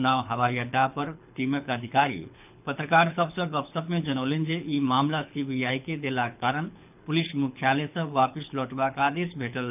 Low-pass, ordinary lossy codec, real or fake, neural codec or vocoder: 3.6 kHz; none; fake; codec, 24 kHz, 6 kbps, HILCodec